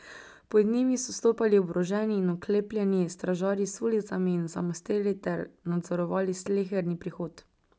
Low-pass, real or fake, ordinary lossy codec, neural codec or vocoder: none; real; none; none